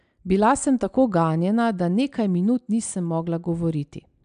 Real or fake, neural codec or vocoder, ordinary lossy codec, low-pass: real; none; AAC, 96 kbps; 9.9 kHz